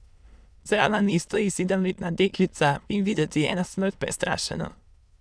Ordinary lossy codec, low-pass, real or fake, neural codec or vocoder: none; none; fake; autoencoder, 22.05 kHz, a latent of 192 numbers a frame, VITS, trained on many speakers